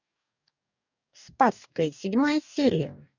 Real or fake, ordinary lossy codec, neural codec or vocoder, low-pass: fake; none; codec, 44.1 kHz, 2.6 kbps, DAC; 7.2 kHz